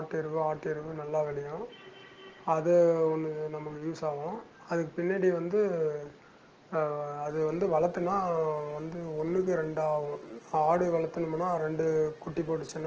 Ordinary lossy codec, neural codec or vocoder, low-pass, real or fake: Opus, 16 kbps; none; 7.2 kHz; real